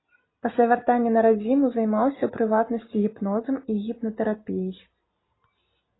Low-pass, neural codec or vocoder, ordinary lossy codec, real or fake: 7.2 kHz; none; AAC, 16 kbps; real